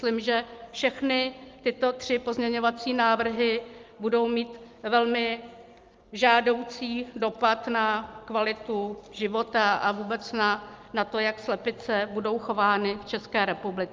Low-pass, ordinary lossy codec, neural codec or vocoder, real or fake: 7.2 kHz; Opus, 24 kbps; none; real